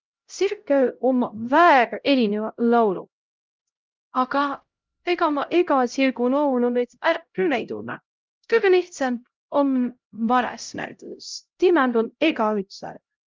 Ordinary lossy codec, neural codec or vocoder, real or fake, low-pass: Opus, 32 kbps; codec, 16 kHz, 0.5 kbps, X-Codec, HuBERT features, trained on LibriSpeech; fake; 7.2 kHz